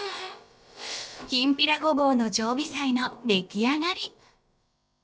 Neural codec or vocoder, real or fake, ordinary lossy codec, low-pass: codec, 16 kHz, about 1 kbps, DyCAST, with the encoder's durations; fake; none; none